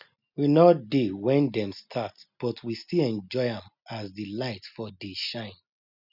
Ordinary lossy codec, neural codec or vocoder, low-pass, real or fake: none; none; 5.4 kHz; real